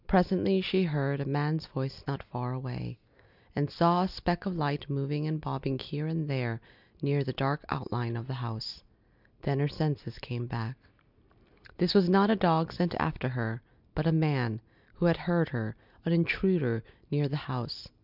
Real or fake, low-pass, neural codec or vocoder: real; 5.4 kHz; none